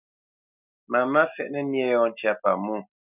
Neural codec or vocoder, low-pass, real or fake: none; 3.6 kHz; real